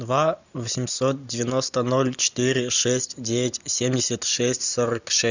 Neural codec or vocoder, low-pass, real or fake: none; 7.2 kHz; real